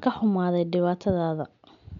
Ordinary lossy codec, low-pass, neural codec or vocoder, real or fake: none; 7.2 kHz; none; real